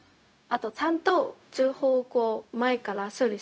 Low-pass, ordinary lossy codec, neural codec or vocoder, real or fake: none; none; codec, 16 kHz, 0.4 kbps, LongCat-Audio-Codec; fake